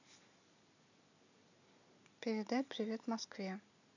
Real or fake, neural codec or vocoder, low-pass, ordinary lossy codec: real; none; 7.2 kHz; none